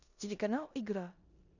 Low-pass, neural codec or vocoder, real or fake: 7.2 kHz; codec, 16 kHz in and 24 kHz out, 0.9 kbps, LongCat-Audio-Codec, four codebook decoder; fake